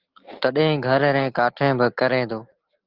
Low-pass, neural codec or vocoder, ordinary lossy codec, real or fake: 5.4 kHz; none; Opus, 16 kbps; real